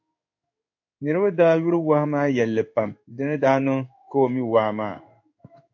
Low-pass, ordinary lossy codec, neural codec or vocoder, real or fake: 7.2 kHz; AAC, 48 kbps; codec, 16 kHz in and 24 kHz out, 1 kbps, XY-Tokenizer; fake